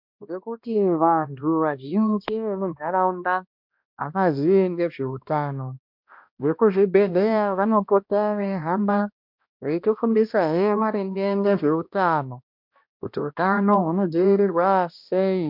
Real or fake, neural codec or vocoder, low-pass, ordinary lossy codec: fake; codec, 16 kHz, 1 kbps, X-Codec, HuBERT features, trained on balanced general audio; 5.4 kHz; MP3, 48 kbps